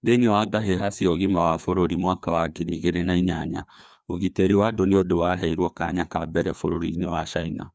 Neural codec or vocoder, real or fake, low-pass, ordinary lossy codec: codec, 16 kHz, 2 kbps, FreqCodec, larger model; fake; none; none